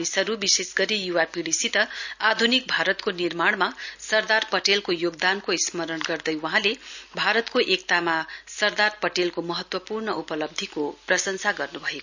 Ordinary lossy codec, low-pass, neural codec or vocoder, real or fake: none; 7.2 kHz; none; real